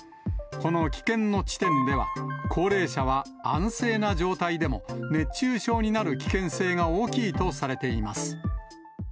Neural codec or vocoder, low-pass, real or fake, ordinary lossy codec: none; none; real; none